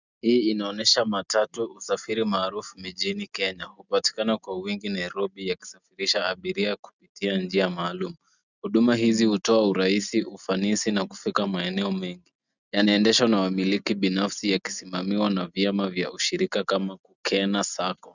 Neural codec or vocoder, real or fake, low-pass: none; real; 7.2 kHz